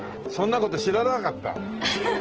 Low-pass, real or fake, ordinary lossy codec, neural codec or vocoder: 7.2 kHz; real; Opus, 16 kbps; none